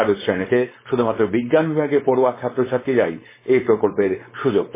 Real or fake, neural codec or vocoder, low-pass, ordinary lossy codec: fake; codec, 16 kHz, 4.8 kbps, FACodec; 3.6 kHz; MP3, 16 kbps